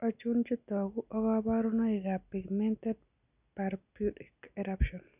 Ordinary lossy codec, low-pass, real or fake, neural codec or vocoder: none; 3.6 kHz; real; none